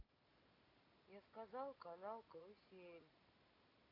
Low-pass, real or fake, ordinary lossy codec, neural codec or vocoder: 5.4 kHz; real; AAC, 48 kbps; none